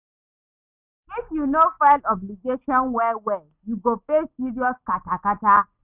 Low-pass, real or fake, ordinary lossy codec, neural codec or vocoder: 3.6 kHz; real; none; none